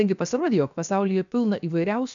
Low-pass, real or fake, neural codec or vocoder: 7.2 kHz; fake; codec, 16 kHz, 0.7 kbps, FocalCodec